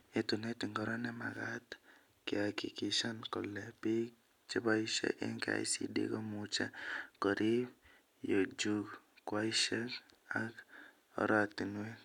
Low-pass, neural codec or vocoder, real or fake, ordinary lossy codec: 19.8 kHz; none; real; none